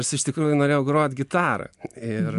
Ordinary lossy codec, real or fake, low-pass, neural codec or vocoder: MP3, 64 kbps; real; 10.8 kHz; none